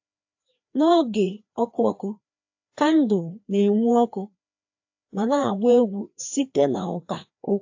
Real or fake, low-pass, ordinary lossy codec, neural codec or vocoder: fake; 7.2 kHz; AAC, 48 kbps; codec, 16 kHz, 2 kbps, FreqCodec, larger model